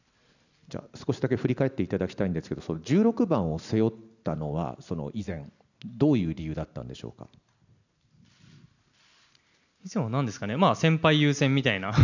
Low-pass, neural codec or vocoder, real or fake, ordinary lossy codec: 7.2 kHz; none; real; none